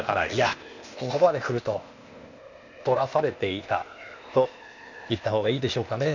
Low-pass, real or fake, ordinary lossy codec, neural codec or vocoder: 7.2 kHz; fake; none; codec, 16 kHz, 0.8 kbps, ZipCodec